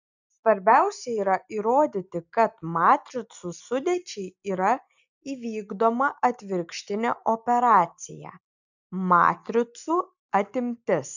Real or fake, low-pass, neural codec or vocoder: real; 7.2 kHz; none